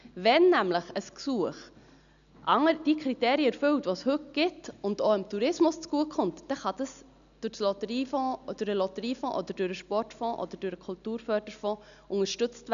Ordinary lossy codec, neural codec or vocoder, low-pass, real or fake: none; none; 7.2 kHz; real